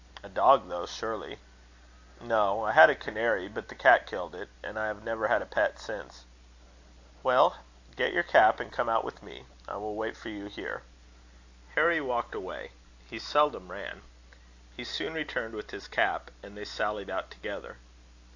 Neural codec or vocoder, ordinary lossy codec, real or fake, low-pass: none; AAC, 48 kbps; real; 7.2 kHz